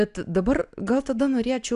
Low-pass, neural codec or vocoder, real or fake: 10.8 kHz; none; real